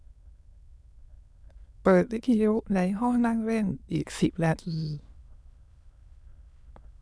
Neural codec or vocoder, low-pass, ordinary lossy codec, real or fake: autoencoder, 22.05 kHz, a latent of 192 numbers a frame, VITS, trained on many speakers; none; none; fake